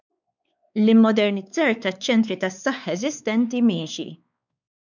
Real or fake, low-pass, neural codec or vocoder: fake; 7.2 kHz; codec, 16 kHz, 4 kbps, X-Codec, WavLM features, trained on Multilingual LibriSpeech